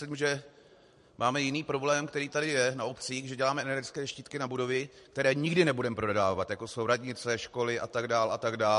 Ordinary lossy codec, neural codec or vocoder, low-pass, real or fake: MP3, 48 kbps; vocoder, 44.1 kHz, 128 mel bands every 512 samples, BigVGAN v2; 14.4 kHz; fake